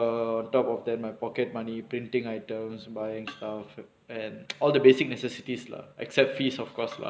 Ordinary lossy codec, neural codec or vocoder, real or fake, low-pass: none; none; real; none